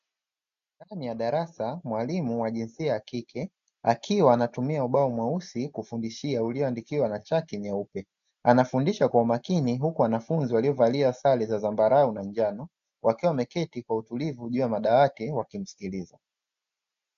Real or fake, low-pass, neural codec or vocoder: real; 7.2 kHz; none